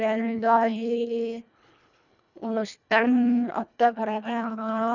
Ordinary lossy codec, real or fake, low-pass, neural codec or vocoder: none; fake; 7.2 kHz; codec, 24 kHz, 1.5 kbps, HILCodec